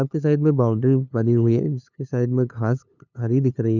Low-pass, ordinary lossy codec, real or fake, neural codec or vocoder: 7.2 kHz; none; fake; codec, 16 kHz, 2 kbps, FunCodec, trained on LibriTTS, 25 frames a second